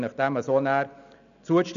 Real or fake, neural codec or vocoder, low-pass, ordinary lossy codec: real; none; 7.2 kHz; none